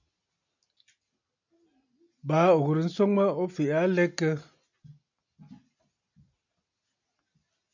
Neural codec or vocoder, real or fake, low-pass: none; real; 7.2 kHz